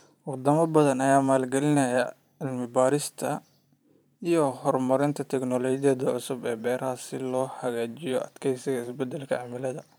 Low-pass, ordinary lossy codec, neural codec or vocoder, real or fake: none; none; vocoder, 44.1 kHz, 128 mel bands every 512 samples, BigVGAN v2; fake